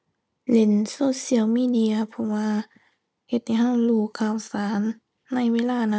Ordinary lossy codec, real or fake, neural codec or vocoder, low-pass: none; real; none; none